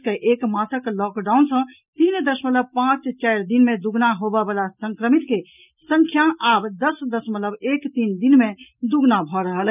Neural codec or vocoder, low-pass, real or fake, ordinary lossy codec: none; 3.6 kHz; real; none